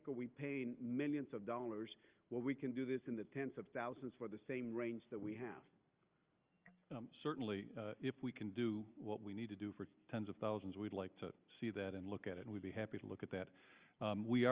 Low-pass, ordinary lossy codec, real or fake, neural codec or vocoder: 3.6 kHz; Opus, 24 kbps; real; none